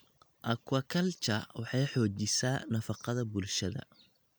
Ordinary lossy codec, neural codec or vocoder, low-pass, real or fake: none; none; none; real